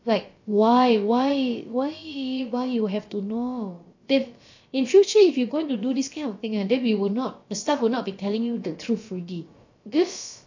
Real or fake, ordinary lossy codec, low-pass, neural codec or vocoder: fake; AAC, 48 kbps; 7.2 kHz; codec, 16 kHz, about 1 kbps, DyCAST, with the encoder's durations